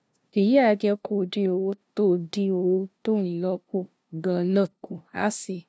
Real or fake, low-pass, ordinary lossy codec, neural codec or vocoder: fake; none; none; codec, 16 kHz, 0.5 kbps, FunCodec, trained on LibriTTS, 25 frames a second